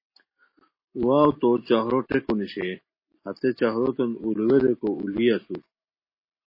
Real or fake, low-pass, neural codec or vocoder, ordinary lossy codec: real; 5.4 kHz; none; MP3, 24 kbps